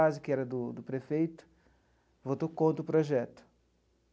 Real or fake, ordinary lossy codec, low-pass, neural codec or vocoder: real; none; none; none